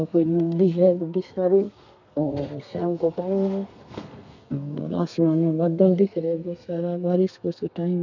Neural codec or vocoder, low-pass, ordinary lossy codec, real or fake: codec, 32 kHz, 1.9 kbps, SNAC; 7.2 kHz; none; fake